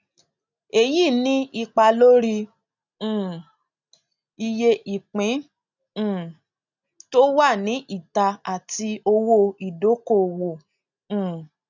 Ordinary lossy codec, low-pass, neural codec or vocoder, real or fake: none; 7.2 kHz; none; real